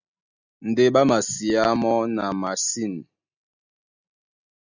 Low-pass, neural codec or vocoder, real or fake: 7.2 kHz; none; real